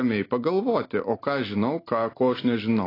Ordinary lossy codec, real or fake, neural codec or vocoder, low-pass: AAC, 24 kbps; real; none; 5.4 kHz